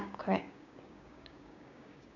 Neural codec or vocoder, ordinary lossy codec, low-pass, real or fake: codec, 16 kHz, 6 kbps, DAC; none; 7.2 kHz; fake